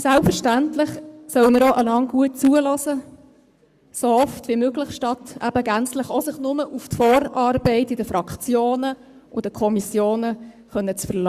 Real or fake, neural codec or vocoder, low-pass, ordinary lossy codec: fake; codec, 44.1 kHz, 7.8 kbps, Pupu-Codec; 14.4 kHz; Opus, 64 kbps